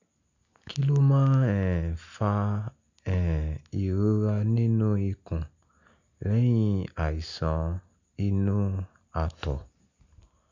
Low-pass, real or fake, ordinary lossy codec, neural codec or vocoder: 7.2 kHz; real; none; none